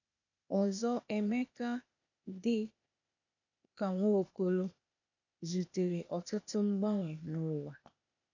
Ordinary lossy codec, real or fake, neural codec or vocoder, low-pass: none; fake; codec, 16 kHz, 0.8 kbps, ZipCodec; 7.2 kHz